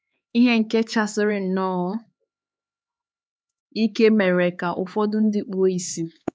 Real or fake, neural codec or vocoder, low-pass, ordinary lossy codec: fake; codec, 16 kHz, 4 kbps, X-Codec, HuBERT features, trained on LibriSpeech; none; none